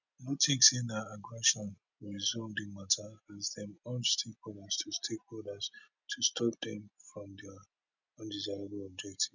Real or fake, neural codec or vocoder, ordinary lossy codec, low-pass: real; none; none; 7.2 kHz